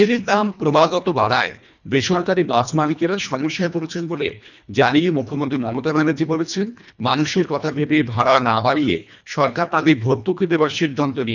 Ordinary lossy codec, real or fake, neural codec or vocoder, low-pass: none; fake; codec, 24 kHz, 1.5 kbps, HILCodec; 7.2 kHz